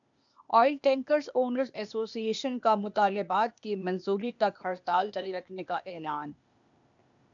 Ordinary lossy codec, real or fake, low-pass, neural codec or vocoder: MP3, 96 kbps; fake; 7.2 kHz; codec, 16 kHz, 0.8 kbps, ZipCodec